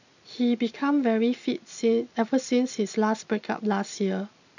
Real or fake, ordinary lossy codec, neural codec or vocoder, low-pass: real; none; none; 7.2 kHz